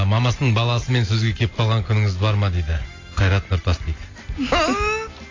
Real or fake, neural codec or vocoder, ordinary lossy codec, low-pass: real; none; AAC, 32 kbps; 7.2 kHz